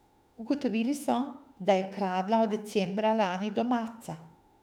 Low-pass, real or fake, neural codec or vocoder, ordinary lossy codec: 19.8 kHz; fake; autoencoder, 48 kHz, 32 numbers a frame, DAC-VAE, trained on Japanese speech; none